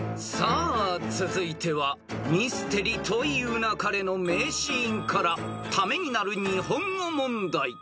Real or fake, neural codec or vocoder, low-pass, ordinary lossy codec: real; none; none; none